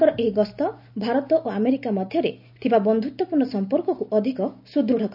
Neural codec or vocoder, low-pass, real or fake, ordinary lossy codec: none; 5.4 kHz; real; none